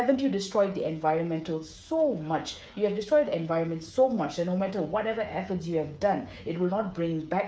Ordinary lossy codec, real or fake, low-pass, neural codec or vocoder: none; fake; none; codec, 16 kHz, 8 kbps, FreqCodec, smaller model